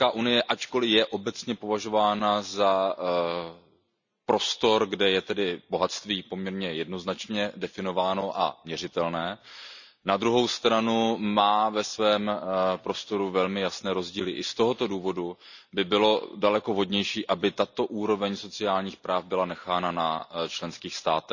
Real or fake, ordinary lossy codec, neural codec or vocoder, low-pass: real; none; none; 7.2 kHz